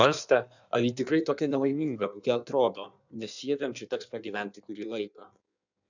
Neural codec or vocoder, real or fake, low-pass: codec, 16 kHz in and 24 kHz out, 1.1 kbps, FireRedTTS-2 codec; fake; 7.2 kHz